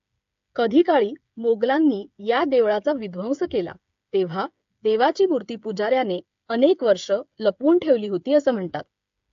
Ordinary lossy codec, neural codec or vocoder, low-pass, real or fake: AAC, 64 kbps; codec, 16 kHz, 8 kbps, FreqCodec, smaller model; 7.2 kHz; fake